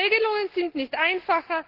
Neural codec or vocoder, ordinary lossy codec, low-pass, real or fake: none; Opus, 16 kbps; 5.4 kHz; real